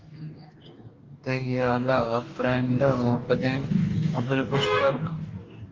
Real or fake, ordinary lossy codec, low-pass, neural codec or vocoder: fake; Opus, 24 kbps; 7.2 kHz; codec, 44.1 kHz, 2.6 kbps, DAC